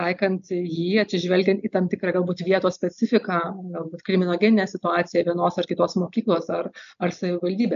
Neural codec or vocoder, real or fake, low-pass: none; real; 7.2 kHz